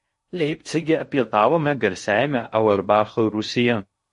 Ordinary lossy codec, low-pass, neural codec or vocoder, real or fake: MP3, 48 kbps; 10.8 kHz; codec, 16 kHz in and 24 kHz out, 0.6 kbps, FocalCodec, streaming, 2048 codes; fake